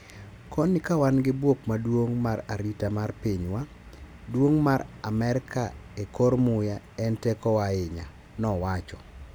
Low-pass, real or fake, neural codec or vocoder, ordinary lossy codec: none; real; none; none